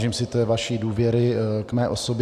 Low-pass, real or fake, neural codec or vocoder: 14.4 kHz; real; none